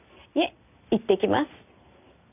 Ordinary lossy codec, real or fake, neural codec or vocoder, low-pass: none; real; none; 3.6 kHz